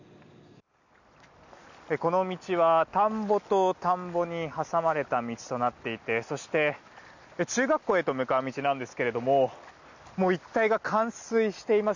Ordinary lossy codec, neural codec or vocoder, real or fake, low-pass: none; none; real; 7.2 kHz